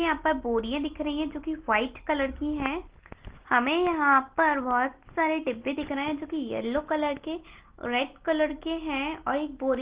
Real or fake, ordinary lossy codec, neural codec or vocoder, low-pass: real; Opus, 16 kbps; none; 3.6 kHz